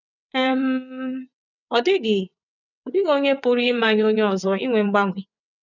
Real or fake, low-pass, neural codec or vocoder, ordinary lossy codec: fake; 7.2 kHz; vocoder, 22.05 kHz, 80 mel bands, WaveNeXt; none